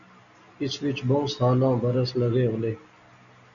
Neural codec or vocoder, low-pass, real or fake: none; 7.2 kHz; real